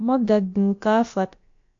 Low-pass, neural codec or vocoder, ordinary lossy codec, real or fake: 7.2 kHz; codec, 16 kHz, about 1 kbps, DyCAST, with the encoder's durations; AAC, 48 kbps; fake